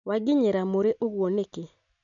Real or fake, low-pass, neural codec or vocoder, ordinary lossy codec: real; 7.2 kHz; none; none